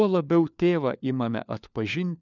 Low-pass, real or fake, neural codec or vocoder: 7.2 kHz; fake; codec, 16 kHz, 4 kbps, FunCodec, trained on LibriTTS, 50 frames a second